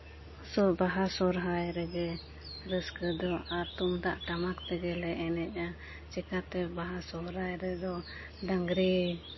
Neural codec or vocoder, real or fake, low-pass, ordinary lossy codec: none; real; 7.2 kHz; MP3, 24 kbps